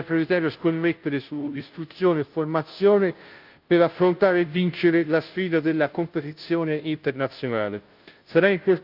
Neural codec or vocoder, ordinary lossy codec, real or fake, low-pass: codec, 16 kHz, 0.5 kbps, FunCodec, trained on Chinese and English, 25 frames a second; Opus, 32 kbps; fake; 5.4 kHz